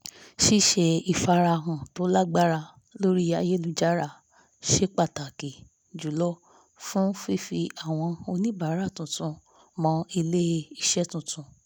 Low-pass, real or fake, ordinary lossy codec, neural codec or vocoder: none; real; none; none